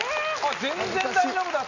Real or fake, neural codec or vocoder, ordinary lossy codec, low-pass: real; none; none; 7.2 kHz